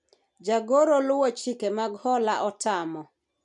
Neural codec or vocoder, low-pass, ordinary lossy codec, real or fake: none; 10.8 kHz; none; real